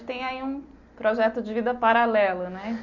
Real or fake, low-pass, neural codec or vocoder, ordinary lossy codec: real; 7.2 kHz; none; none